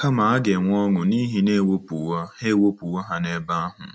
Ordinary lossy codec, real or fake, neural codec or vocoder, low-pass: none; real; none; none